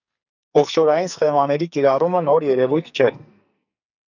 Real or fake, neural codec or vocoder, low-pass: fake; codec, 44.1 kHz, 2.6 kbps, SNAC; 7.2 kHz